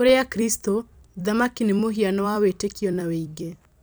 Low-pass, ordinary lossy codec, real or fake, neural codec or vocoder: none; none; fake; vocoder, 44.1 kHz, 128 mel bands every 256 samples, BigVGAN v2